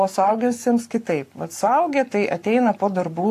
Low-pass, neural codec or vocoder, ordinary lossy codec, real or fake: 14.4 kHz; codec, 44.1 kHz, 7.8 kbps, Pupu-Codec; AAC, 64 kbps; fake